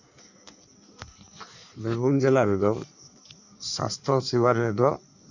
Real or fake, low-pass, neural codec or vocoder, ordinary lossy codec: fake; 7.2 kHz; codec, 16 kHz in and 24 kHz out, 1.1 kbps, FireRedTTS-2 codec; none